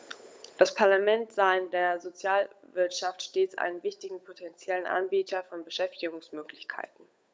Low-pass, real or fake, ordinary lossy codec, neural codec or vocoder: none; fake; none; codec, 16 kHz, 8 kbps, FunCodec, trained on Chinese and English, 25 frames a second